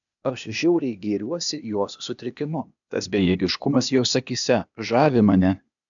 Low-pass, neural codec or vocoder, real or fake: 7.2 kHz; codec, 16 kHz, 0.8 kbps, ZipCodec; fake